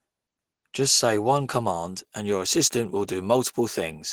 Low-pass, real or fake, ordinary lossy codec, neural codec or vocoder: 19.8 kHz; real; Opus, 16 kbps; none